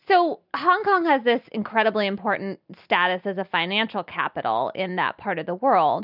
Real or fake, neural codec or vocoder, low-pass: real; none; 5.4 kHz